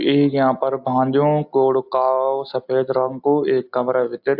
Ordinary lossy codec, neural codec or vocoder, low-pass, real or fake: AAC, 48 kbps; none; 5.4 kHz; real